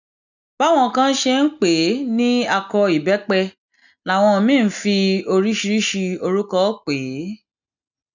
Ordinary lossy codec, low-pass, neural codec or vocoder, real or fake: none; 7.2 kHz; none; real